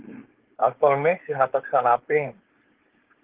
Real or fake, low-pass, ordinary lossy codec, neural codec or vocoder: fake; 3.6 kHz; Opus, 16 kbps; codec, 16 kHz, 4.8 kbps, FACodec